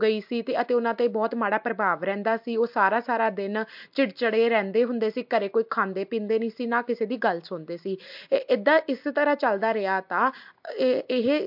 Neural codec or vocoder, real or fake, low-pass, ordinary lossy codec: none; real; 5.4 kHz; MP3, 48 kbps